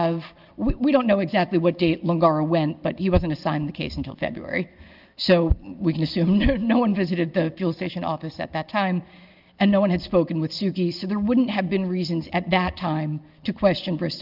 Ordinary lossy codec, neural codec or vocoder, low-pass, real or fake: Opus, 24 kbps; none; 5.4 kHz; real